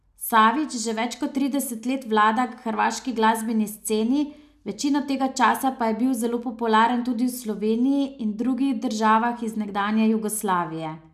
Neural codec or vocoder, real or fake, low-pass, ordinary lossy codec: none; real; 14.4 kHz; none